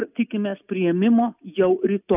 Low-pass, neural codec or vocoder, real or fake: 3.6 kHz; none; real